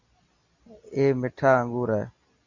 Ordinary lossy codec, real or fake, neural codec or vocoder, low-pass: Opus, 64 kbps; real; none; 7.2 kHz